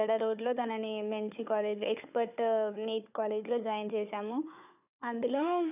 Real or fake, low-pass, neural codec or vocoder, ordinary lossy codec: fake; 3.6 kHz; codec, 16 kHz, 4 kbps, FunCodec, trained on Chinese and English, 50 frames a second; none